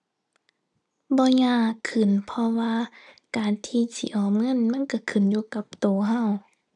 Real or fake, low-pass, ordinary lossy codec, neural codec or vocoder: real; 10.8 kHz; none; none